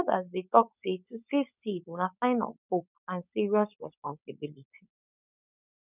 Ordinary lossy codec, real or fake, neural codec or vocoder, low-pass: none; fake; codec, 16 kHz, 4.8 kbps, FACodec; 3.6 kHz